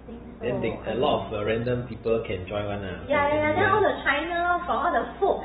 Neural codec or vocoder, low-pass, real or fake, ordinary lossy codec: vocoder, 44.1 kHz, 128 mel bands every 512 samples, BigVGAN v2; 19.8 kHz; fake; AAC, 16 kbps